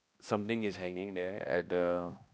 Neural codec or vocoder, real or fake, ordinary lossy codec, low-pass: codec, 16 kHz, 1 kbps, X-Codec, HuBERT features, trained on balanced general audio; fake; none; none